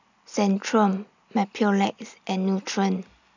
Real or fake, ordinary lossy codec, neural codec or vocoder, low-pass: real; none; none; 7.2 kHz